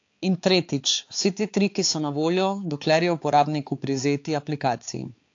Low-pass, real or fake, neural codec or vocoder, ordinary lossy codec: 7.2 kHz; fake; codec, 16 kHz, 4 kbps, X-Codec, HuBERT features, trained on balanced general audio; AAC, 48 kbps